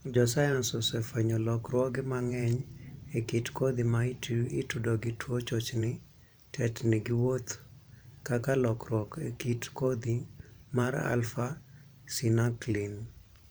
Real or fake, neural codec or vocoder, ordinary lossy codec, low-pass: fake; vocoder, 44.1 kHz, 128 mel bands every 512 samples, BigVGAN v2; none; none